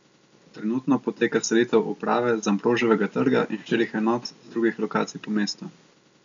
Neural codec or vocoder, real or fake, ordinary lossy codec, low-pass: none; real; MP3, 64 kbps; 7.2 kHz